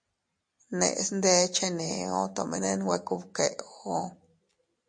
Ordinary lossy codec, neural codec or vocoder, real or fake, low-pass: MP3, 48 kbps; none; real; 9.9 kHz